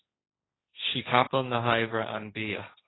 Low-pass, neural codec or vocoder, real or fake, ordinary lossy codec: 7.2 kHz; codec, 16 kHz, 1.1 kbps, Voila-Tokenizer; fake; AAC, 16 kbps